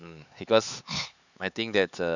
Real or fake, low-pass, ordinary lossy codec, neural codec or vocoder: fake; 7.2 kHz; none; codec, 16 kHz, 4 kbps, X-Codec, HuBERT features, trained on LibriSpeech